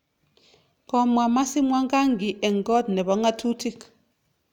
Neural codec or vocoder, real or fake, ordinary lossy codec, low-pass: none; real; none; 19.8 kHz